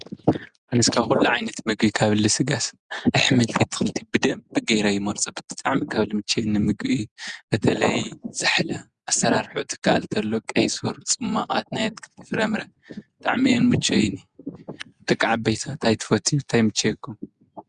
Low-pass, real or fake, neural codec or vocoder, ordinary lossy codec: 9.9 kHz; real; none; Opus, 64 kbps